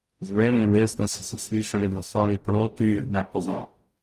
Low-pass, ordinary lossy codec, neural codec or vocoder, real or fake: 14.4 kHz; Opus, 32 kbps; codec, 44.1 kHz, 0.9 kbps, DAC; fake